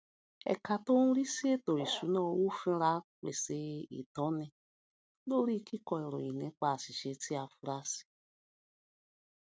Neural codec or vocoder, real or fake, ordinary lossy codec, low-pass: none; real; none; none